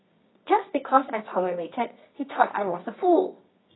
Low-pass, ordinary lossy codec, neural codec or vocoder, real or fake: 7.2 kHz; AAC, 16 kbps; codec, 24 kHz, 0.9 kbps, WavTokenizer, medium music audio release; fake